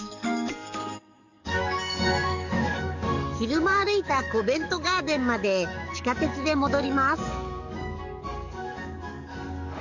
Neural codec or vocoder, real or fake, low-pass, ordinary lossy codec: codec, 44.1 kHz, 7.8 kbps, DAC; fake; 7.2 kHz; none